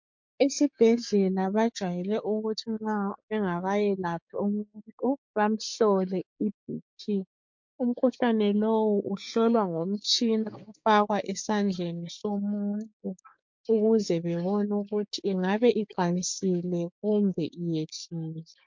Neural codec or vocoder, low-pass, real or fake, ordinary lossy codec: codec, 16 kHz, 6 kbps, DAC; 7.2 kHz; fake; MP3, 48 kbps